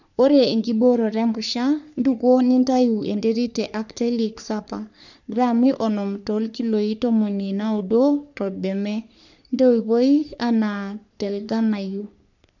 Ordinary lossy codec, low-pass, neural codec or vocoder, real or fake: none; 7.2 kHz; codec, 44.1 kHz, 3.4 kbps, Pupu-Codec; fake